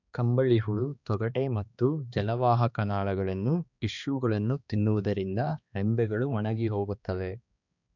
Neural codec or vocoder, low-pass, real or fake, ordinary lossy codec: codec, 16 kHz, 2 kbps, X-Codec, HuBERT features, trained on balanced general audio; 7.2 kHz; fake; none